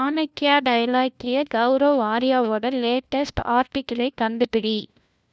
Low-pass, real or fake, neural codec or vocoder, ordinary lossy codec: none; fake; codec, 16 kHz, 1 kbps, FunCodec, trained on LibriTTS, 50 frames a second; none